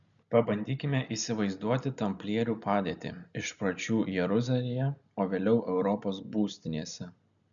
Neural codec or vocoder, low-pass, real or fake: none; 7.2 kHz; real